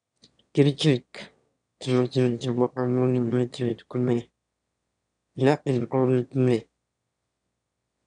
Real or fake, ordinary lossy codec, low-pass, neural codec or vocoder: fake; none; 9.9 kHz; autoencoder, 22.05 kHz, a latent of 192 numbers a frame, VITS, trained on one speaker